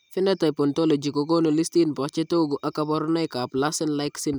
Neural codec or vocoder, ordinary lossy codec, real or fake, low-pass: none; none; real; none